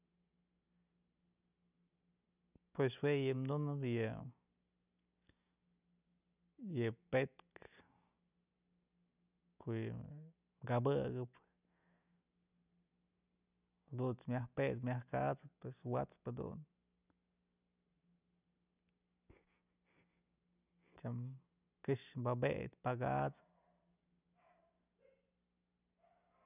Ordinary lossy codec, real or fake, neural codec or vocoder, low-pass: none; real; none; 3.6 kHz